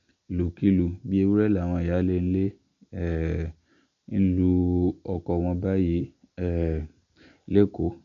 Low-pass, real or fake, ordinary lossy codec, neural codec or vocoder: 7.2 kHz; real; MP3, 48 kbps; none